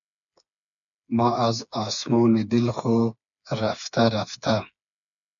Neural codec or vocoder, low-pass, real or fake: codec, 16 kHz, 4 kbps, FreqCodec, smaller model; 7.2 kHz; fake